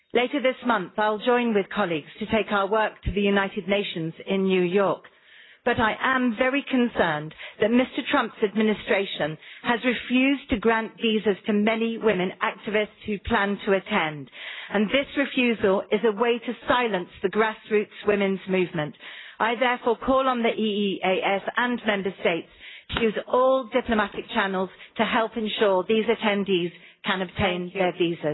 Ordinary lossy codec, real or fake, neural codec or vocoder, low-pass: AAC, 16 kbps; real; none; 7.2 kHz